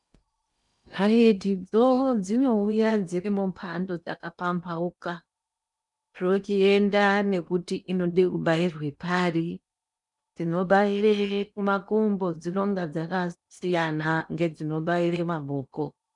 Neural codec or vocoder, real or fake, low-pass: codec, 16 kHz in and 24 kHz out, 0.8 kbps, FocalCodec, streaming, 65536 codes; fake; 10.8 kHz